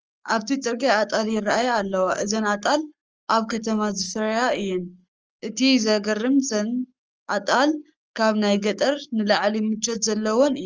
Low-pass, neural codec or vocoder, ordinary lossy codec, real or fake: 7.2 kHz; codec, 44.1 kHz, 7.8 kbps, Pupu-Codec; Opus, 24 kbps; fake